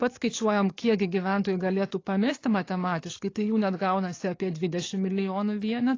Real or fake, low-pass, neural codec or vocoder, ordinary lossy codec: fake; 7.2 kHz; codec, 44.1 kHz, 7.8 kbps, DAC; AAC, 32 kbps